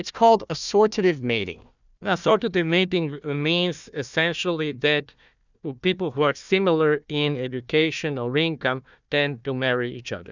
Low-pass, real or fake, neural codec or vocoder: 7.2 kHz; fake; codec, 16 kHz, 1 kbps, FunCodec, trained on Chinese and English, 50 frames a second